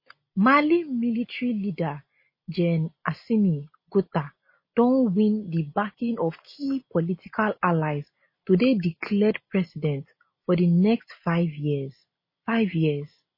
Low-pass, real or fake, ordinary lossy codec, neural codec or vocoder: 5.4 kHz; real; MP3, 24 kbps; none